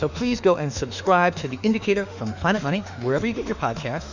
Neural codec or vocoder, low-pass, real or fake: autoencoder, 48 kHz, 32 numbers a frame, DAC-VAE, trained on Japanese speech; 7.2 kHz; fake